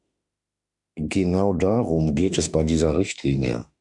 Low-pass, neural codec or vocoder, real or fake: 10.8 kHz; autoencoder, 48 kHz, 32 numbers a frame, DAC-VAE, trained on Japanese speech; fake